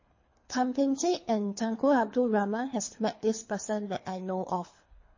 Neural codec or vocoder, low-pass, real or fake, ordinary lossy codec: codec, 24 kHz, 3 kbps, HILCodec; 7.2 kHz; fake; MP3, 32 kbps